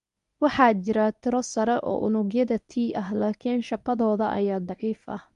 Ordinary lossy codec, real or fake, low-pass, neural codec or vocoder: none; fake; 10.8 kHz; codec, 24 kHz, 0.9 kbps, WavTokenizer, medium speech release version 1